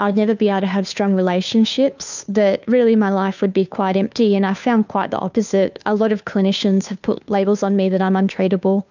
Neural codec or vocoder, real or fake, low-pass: codec, 16 kHz, 2 kbps, FunCodec, trained on Chinese and English, 25 frames a second; fake; 7.2 kHz